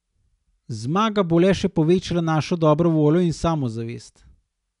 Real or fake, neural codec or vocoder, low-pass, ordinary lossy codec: real; none; 10.8 kHz; none